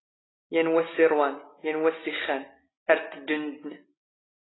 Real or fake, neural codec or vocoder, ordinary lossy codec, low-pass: real; none; AAC, 16 kbps; 7.2 kHz